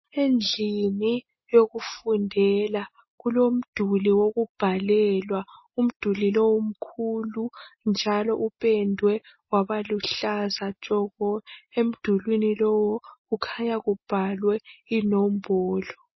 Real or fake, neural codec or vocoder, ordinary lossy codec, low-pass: real; none; MP3, 24 kbps; 7.2 kHz